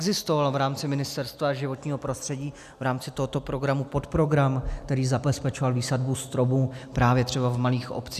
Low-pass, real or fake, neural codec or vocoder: 14.4 kHz; real; none